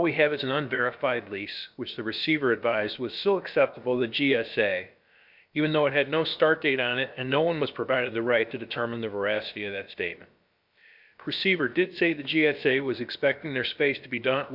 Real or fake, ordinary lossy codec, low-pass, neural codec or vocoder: fake; MP3, 48 kbps; 5.4 kHz; codec, 16 kHz, about 1 kbps, DyCAST, with the encoder's durations